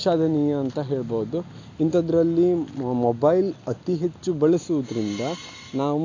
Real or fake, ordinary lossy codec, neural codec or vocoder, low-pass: real; AAC, 48 kbps; none; 7.2 kHz